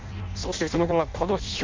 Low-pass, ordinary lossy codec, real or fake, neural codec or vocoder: 7.2 kHz; MP3, 48 kbps; fake; codec, 16 kHz in and 24 kHz out, 0.6 kbps, FireRedTTS-2 codec